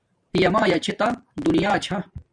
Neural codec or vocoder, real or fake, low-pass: vocoder, 44.1 kHz, 128 mel bands every 512 samples, BigVGAN v2; fake; 9.9 kHz